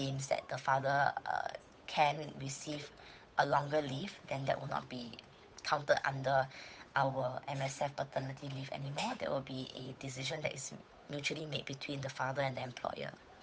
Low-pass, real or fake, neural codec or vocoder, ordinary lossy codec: none; fake; codec, 16 kHz, 8 kbps, FunCodec, trained on Chinese and English, 25 frames a second; none